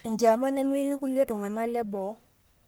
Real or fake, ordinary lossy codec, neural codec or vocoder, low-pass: fake; none; codec, 44.1 kHz, 1.7 kbps, Pupu-Codec; none